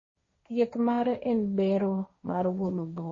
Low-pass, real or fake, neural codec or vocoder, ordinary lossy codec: 7.2 kHz; fake; codec, 16 kHz, 1.1 kbps, Voila-Tokenizer; MP3, 32 kbps